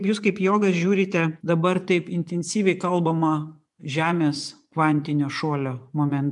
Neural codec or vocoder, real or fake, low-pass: vocoder, 24 kHz, 100 mel bands, Vocos; fake; 10.8 kHz